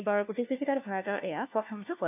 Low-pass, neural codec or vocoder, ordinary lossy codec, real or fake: 3.6 kHz; codec, 16 kHz, 1 kbps, FunCodec, trained on LibriTTS, 50 frames a second; none; fake